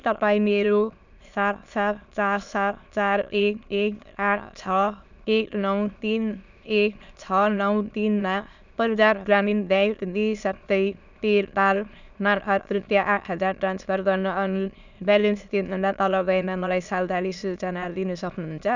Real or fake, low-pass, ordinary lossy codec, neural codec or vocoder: fake; 7.2 kHz; none; autoencoder, 22.05 kHz, a latent of 192 numbers a frame, VITS, trained on many speakers